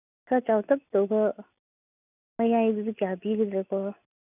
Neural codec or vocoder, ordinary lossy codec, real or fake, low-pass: none; none; real; 3.6 kHz